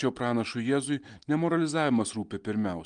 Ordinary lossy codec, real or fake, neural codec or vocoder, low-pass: Opus, 32 kbps; real; none; 9.9 kHz